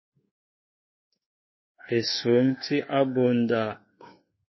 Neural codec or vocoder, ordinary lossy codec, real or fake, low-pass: codec, 24 kHz, 1.2 kbps, DualCodec; MP3, 24 kbps; fake; 7.2 kHz